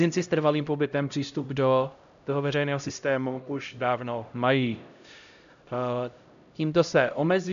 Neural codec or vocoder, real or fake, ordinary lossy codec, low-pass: codec, 16 kHz, 0.5 kbps, X-Codec, HuBERT features, trained on LibriSpeech; fake; MP3, 96 kbps; 7.2 kHz